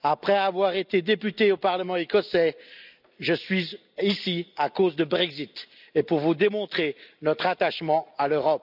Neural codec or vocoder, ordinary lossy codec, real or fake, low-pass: none; none; real; 5.4 kHz